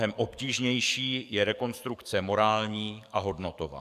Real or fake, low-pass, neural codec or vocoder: fake; 14.4 kHz; codec, 44.1 kHz, 7.8 kbps, DAC